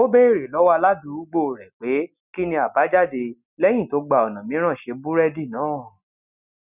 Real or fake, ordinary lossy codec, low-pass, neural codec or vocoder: real; none; 3.6 kHz; none